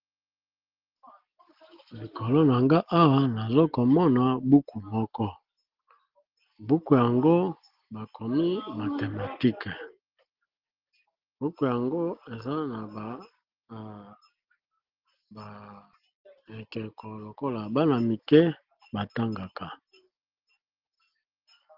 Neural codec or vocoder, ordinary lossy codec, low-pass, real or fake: none; Opus, 16 kbps; 5.4 kHz; real